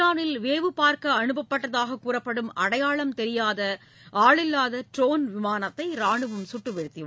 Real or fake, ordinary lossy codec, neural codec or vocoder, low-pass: real; none; none; none